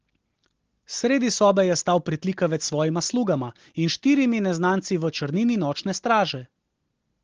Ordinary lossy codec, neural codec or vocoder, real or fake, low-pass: Opus, 16 kbps; none; real; 7.2 kHz